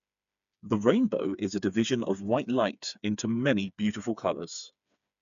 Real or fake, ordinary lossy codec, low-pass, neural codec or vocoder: fake; none; 7.2 kHz; codec, 16 kHz, 4 kbps, FreqCodec, smaller model